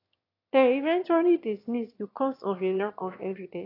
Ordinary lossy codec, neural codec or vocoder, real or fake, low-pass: AAC, 32 kbps; autoencoder, 22.05 kHz, a latent of 192 numbers a frame, VITS, trained on one speaker; fake; 5.4 kHz